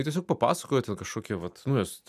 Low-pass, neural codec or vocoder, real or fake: 14.4 kHz; none; real